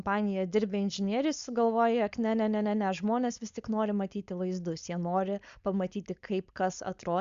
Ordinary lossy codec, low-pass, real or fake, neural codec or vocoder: Opus, 64 kbps; 7.2 kHz; fake; codec, 16 kHz, 4.8 kbps, FACodec